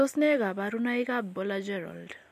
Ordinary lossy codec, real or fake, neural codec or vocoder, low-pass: MP3, 64 kbps; real; none; 14.4 kHz